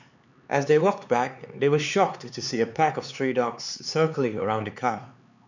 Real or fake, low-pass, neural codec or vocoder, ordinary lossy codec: fake; 7.2 kHz; codec, 16 kHz, 4 kbps, X-Codec, HuBERT features, trained on LibriSpeech; none